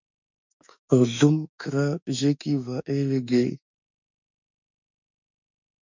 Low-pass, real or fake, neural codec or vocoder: 7.2 kHz; fake; autoencoder, 48 kHz, 32 numbers a frame, DAC-VAE, trained on Japanese speech